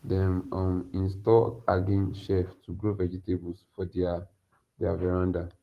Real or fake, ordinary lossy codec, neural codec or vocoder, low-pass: fake; Opus, 24 kbps; vocoder, 44.1 kHz, 128 mel bands every 512 samples, BigVGAN v2; 14.4 kHz